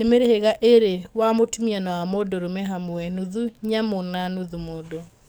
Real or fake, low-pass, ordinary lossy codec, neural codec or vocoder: fake; none; none; codec, 44.1 kHz, 7.8 kbps, DAC